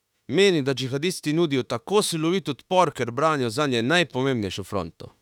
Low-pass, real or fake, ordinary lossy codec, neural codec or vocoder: 19.8 kHz; fake; none; autoencoder, 48 kHz, 32 numbers a frame, DAC-VAE, trained on Japanese speech